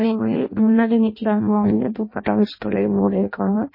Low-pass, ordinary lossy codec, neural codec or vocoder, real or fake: 5.4 kHz; MP3, 24 kbps; codec, 16 kHz in and 24 kHz out, 0.6 kbps, FireRedTTS-2 codec; fake